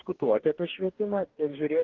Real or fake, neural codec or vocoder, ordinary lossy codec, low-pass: fake; codec, 44.1 kHz, 2.6 kbps, DAC; Opus, 16 kbps; 7.2 kHz